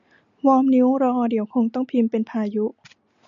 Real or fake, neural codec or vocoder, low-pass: real; none; 7.2 kHz